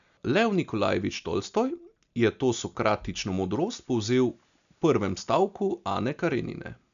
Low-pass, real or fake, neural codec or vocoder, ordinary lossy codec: 7.2 kHz; real; none; none